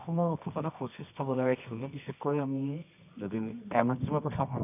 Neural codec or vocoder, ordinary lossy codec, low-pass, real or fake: codec, 24 kHz, 0.9 kbps, WavTokenizer, medium music audio release; none; 3.6 kHz; fake